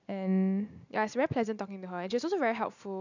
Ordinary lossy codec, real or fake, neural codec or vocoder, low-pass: none; real; none; 7.2 kHz